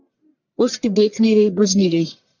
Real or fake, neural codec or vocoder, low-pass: fake; codec, 44.1 kHz, 1.7 kbps, Pupu-Codec; 7.2 kHz